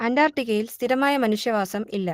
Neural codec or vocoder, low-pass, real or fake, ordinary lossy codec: none; 14.4 kHz; real; Opus, 16 kbps